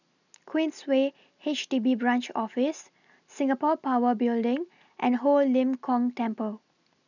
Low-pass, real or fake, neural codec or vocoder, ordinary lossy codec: 7.2 kHz; real; none; none